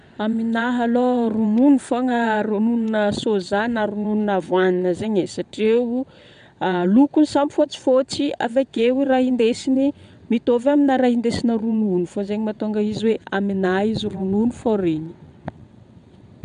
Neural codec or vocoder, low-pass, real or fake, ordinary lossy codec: vocoder, 22.05 kHz, 80 mel bands, WaveNeXt; 9.9 kHz; fake; none